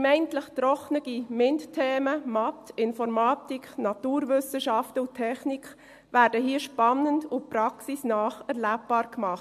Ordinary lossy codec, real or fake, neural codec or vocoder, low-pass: MP3, 64 kbps; real; none; 14.4 kHz